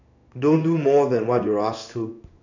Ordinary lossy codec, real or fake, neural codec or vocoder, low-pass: none; fake; codec, 16 kHz in and 24 kHz out, 1 kbps, XY-Tokenizer; 7.2 kHz